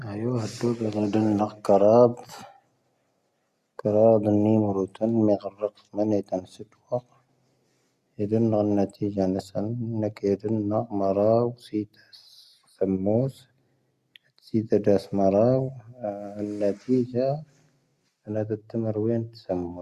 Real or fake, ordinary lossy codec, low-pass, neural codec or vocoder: real; Opus, 64 kbps; 14.4 kHz; none